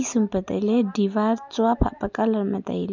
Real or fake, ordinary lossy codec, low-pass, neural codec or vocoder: real; none; 7.2 kHz; none